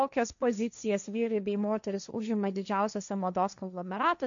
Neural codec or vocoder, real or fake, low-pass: codec, 16 kHz, 1.1 kbps, Voila-Tokenizer; fake; 7.2 kHz